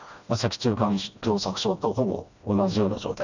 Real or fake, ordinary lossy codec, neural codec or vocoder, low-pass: fake; none; codec, 16 kHz, 1 kbps, FreqCodec, smaller model; 7.2 kHz